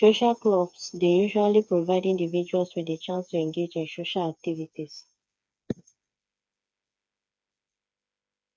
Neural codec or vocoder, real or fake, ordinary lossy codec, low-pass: codec, 16 kHz, 4 kbps, FreqCodec, smaller model; fake; none; none